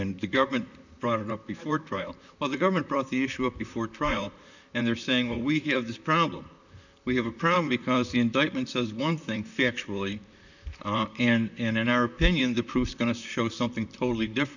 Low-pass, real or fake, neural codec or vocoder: 7.2 kHz; fake; vocoder, 44.1 kHz, 128 mel bands, Pupu-Vocoder